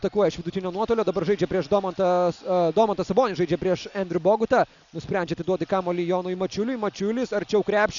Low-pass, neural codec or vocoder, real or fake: 7.2 kHz; none; real